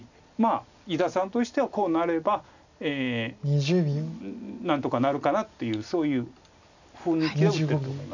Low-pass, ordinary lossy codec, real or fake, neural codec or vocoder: 7.2 kHz; none; real; none